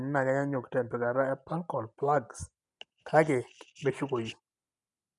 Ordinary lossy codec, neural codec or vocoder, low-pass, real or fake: none; none; 10.8 kHz; real